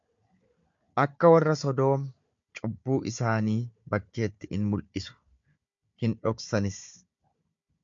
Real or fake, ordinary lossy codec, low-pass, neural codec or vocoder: fake; MP3, 48 kbps; 7.2 kHz; codec, 16 kHz, 4 kbps, FunCodec, trained on Chinese and English, 50 frames a second